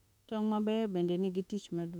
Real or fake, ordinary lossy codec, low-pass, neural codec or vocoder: fake; none; 19.8 kHz; autoencoder, 48 kHz, 32 numbers a frame, DAC-VAE, trained on Japanese speech